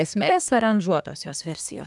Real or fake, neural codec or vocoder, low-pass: fake; codec, 24 kHz, 1 kbps, SNAC; 10.8 kHz